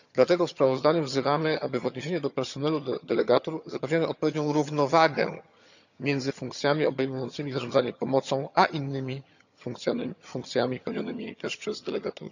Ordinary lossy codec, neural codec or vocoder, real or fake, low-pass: none; vocoder, 22.05 kHz, 80 mel bands, HiFi-GAN; fake; 7.2 kHz